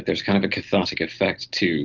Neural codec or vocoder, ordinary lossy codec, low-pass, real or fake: none; Opus, 16 kbps; 7.2 kHz; real